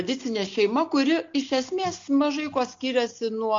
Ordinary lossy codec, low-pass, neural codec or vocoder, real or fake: MP3, 48 kbps; 7.2 kHz; none; real